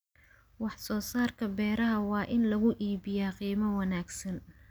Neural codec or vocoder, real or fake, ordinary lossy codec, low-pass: none; real; none; none